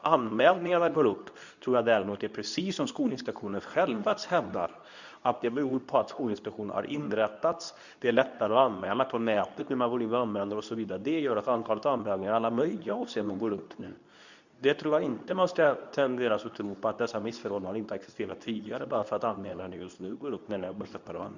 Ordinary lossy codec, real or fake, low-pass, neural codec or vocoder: none; fake; 7.2 kHz; codec, 24 kHz, 0.9 kbps, WavTokenizer, medium speech release version 2